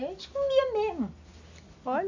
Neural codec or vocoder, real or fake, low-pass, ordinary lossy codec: none; real; 7.2 kHz; AAC, 48 kbps